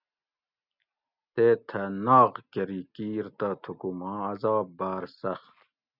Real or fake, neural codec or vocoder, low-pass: real; none; 5.4 kHz